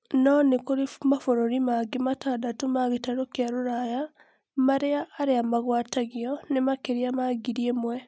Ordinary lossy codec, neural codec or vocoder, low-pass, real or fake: none; none; none; real